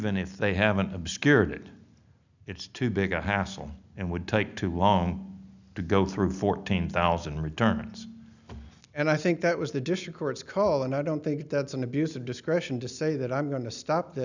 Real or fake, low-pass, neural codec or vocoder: real; 7.2 kHz; none